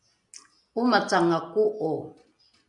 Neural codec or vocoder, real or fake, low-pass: none; real; 10.8 kHz